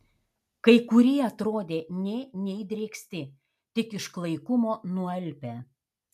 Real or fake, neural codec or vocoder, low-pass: real; none; 14.4 kHz